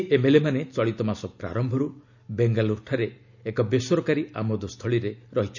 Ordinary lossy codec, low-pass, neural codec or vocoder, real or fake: none; 7.2 kHz; none; real